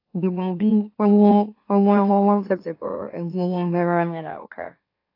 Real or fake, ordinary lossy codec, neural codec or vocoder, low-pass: fake; AAC, 32 kbps; autoencoder, 44.1 kHz, a latent of 192 numbers a frame, MeloTTS; 5.4 kHz